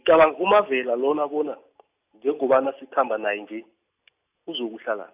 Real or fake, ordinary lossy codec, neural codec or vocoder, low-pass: real; AAC, 32 kbps; none; 3.6 kHz